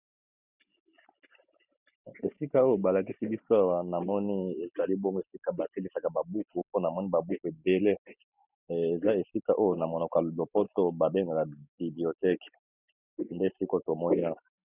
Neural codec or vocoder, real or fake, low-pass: none; real; 3.6 kHz